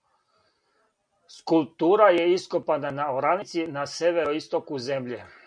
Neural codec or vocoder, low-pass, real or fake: none; 9.9 kHz; real